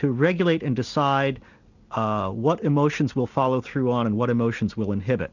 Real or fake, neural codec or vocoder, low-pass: real; none; 7.2 kHz